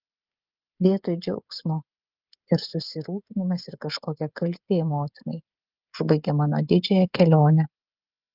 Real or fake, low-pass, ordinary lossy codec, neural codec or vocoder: fake; 5.4 kHz; Opus, 24 kbps; codec, 16 kHz, 16 kbps, FreqCodec, smaller model